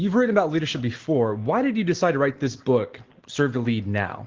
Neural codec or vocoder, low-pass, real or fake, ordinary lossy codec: none; 7.2 kHz; real; Opus, 16 kbps